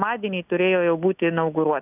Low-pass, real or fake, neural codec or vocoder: 3.6 kHz; real; none